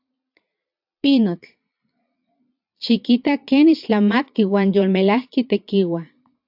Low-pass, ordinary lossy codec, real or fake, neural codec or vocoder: 5.4 kHz; AAC, 48 kbps; fake; vocoder, 22.05 kHz, 80 mel bands, Vocos